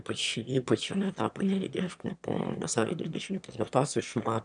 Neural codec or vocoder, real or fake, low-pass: autoencoder, 22.05 kHz, a latent of 192 numbers a frame, VITS, trained on one speaker; fake; 9.9 kHz